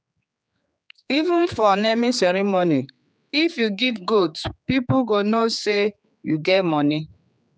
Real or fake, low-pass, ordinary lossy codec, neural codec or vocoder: fake; none; none; codec, 16 kHz, 4 kbps, X-Codec, HuBERT features, trained on general audio